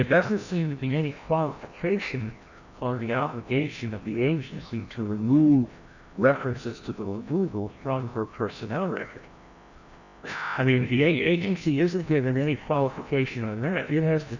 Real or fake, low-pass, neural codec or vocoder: fake; 7.2 kHz; codec, 16 kHz, 1 kbps, FreqCodec, larger model